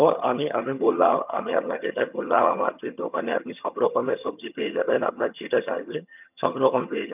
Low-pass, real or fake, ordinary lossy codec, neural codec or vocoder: 3.6 kHz; fake; none; vocoder, 22.05 kHz, 80 mel bands, HiFi-GAN